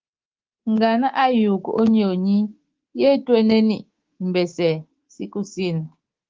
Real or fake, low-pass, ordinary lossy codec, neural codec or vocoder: fake; 7.2 kHz; Opus, 16 kbps; codec, 24 kHz, 3.1 kbps, DualCodec